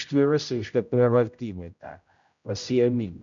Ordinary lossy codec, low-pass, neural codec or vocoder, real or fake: MP3, 64 kbps; 7.2 kHz; codec, 16 kHz, 0.5 kbps, X-Codec, HuBERT features, trained on general audio; fake